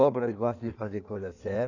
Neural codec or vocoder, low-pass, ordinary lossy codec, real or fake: codec, 16 kHz in and 24 kHz out, 1.1 kbps, FireRedTTS-2 codec; 7.2 kHz; none; fake